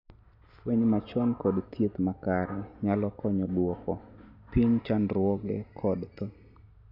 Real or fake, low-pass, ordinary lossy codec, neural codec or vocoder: real; 5.4 kHz; none; none